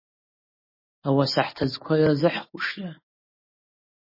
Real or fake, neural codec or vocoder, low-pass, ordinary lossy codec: real; none; 5.4 kHz; MP3, 24 kbps